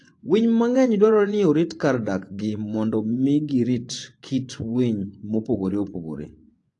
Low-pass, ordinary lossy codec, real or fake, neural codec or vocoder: 10.8 kHz; AAC, 48 kbps; fake; vocoder, 24 kHz, 100 mel bands, Vocos